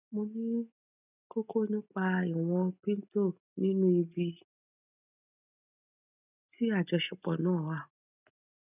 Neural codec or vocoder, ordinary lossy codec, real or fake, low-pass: none; none; real; 3.6 kHz